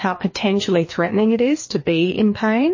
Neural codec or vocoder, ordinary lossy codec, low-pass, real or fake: codec, 16 kHz, 2 kbps, FreqCodec, larger model; MP3, 32 kbps; 7.2 kHz; fake